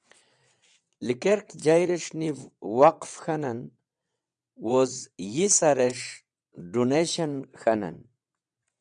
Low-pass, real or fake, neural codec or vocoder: 9.9 kHz; fake; vocoder, 22.05 kHz, 80 mel bands, WaveNeXt